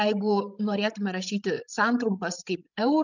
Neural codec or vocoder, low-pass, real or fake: codec, 16 kHz, 16 kbps, FreqCodec, larger model; 7.2 kHz; fake